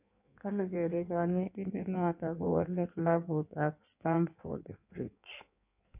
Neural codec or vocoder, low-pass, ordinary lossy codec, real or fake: codec, 16 kHz in and 24 kHz out, 1.1 kbps, FireRedTTS-2 codec; 3.6 kHz; none; fake